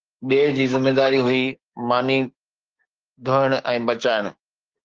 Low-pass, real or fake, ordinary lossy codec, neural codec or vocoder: 7.2 kHz; fake; Opus, 16 kbps; codec, 16 kHz, 6 kbps, DAC